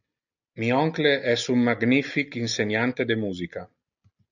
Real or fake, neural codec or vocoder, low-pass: real; none; 7.2 kHz